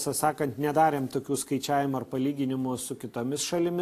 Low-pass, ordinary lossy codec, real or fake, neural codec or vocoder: 14.4 kHz; AAC, 64 kbps; real; none